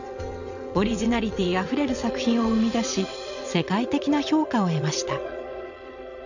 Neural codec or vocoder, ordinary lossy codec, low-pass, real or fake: vocoder, 22.05 kHz, 80 mel bands, WaveNeXt; none; 7.2 kHz; fake